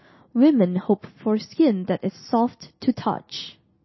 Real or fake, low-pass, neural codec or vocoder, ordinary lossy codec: real; 7.2 kHz; none; MP3, 24 kbps